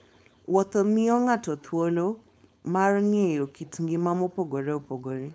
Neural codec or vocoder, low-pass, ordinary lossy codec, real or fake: codec, 16 kHz, 4.8 kbps, FACodec; none; none; fake